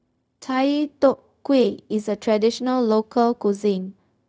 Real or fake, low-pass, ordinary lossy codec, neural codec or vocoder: fake; none; none; codec, 16 kHz, 0.4 kbps, LongCat-Audio-Codec